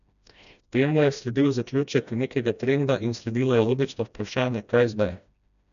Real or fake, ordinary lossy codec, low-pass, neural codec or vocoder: fake; none; 7.2 kHz; codec, 16 kHz, 1 kbps, FreqCodec, smaller model